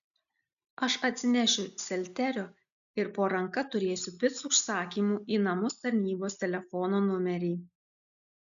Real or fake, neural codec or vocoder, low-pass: real; none; 7.2 kHz